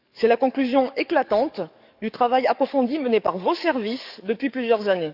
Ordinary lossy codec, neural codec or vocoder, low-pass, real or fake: none; codec, 44.1 kHz, 7.8 kbps, DAC; 5.4 kHz; fake